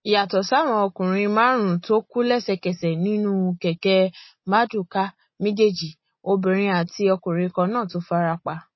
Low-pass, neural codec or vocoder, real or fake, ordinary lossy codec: 7.2 kHz; none; real; MP3, 24 kbps